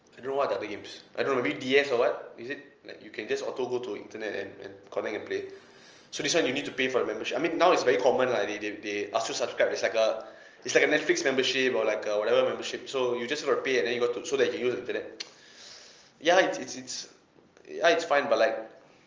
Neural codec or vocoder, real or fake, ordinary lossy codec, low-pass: none; real; Opus, 24 kbps; 7.2 kHz